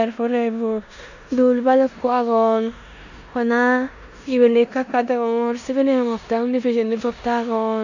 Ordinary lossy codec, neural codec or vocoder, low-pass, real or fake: none; codec, 16 kHz in and 24 kHz out, 0.9 kbps, LongCat-Audio-Codec, four codebook decoder; 7.2 kHz; fake